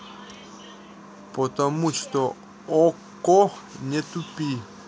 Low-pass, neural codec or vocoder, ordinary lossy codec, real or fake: none; none; none; real